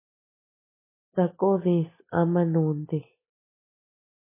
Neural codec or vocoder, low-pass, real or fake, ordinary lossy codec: none; 3.6 kHz; real; MP3, 16 kbps